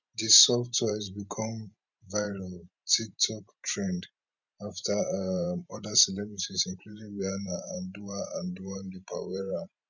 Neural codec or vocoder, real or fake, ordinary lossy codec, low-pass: vocoder, 44.1 kHz, 128 mel bands every 512 samples, BigVGAN v2; fake; none; 7.2 kHz